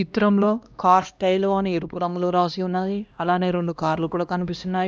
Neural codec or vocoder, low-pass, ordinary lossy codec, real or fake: codec, 16 kHz, 1 kbps, X-Codec, HuBERT features, trained on LibriSpeech; none; none; fake